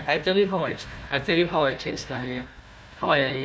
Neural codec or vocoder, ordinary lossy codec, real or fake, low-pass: codec, 16 kHz, 1 kbps, FunCodec, trained on Chinese and English, 50 frames a second; none; fake; none